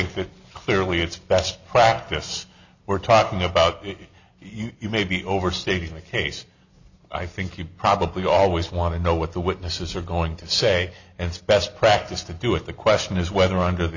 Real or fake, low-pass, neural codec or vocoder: real; 7.2 kHz; none